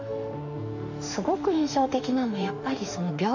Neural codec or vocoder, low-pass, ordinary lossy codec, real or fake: autoencoder, 48 kHz, 32 numbers a frame, DAC-VAE, trained on Japanese speech; 7.2 kHz; none; fake